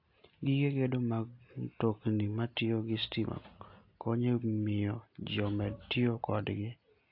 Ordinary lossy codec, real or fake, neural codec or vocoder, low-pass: AAC, 32 kbps; real; none; 5.4 kHz